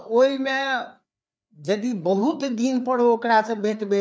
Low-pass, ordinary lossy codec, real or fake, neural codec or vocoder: none; none; fake; codec, 16 kHz, 4 kbps, FreqCodec, larger model